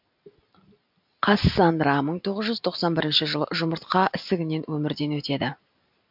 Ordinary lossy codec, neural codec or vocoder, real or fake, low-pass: MP3, 48 kbps; none; real; 5.4 kHz